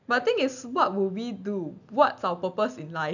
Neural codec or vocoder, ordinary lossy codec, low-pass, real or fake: none; none; 7.2 kHz; real